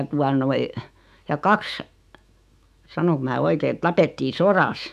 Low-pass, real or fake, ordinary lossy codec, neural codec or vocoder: 14.4 kHz; fake; none; autoencoder, 48 kHz, 128 numbers a frame, DAC-VAE, trained on Japanese speech